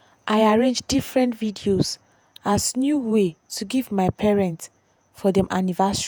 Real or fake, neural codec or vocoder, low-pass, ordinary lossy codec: fake; vocoder, 48 kHz, 128 mel bands, Vocos; none; none